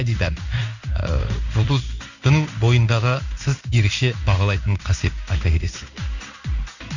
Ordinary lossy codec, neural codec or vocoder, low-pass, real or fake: none; codec, 16 kHz in and 24 kHz out, 1 kbps, XY-Tokenizer; 7.2 kHz; fake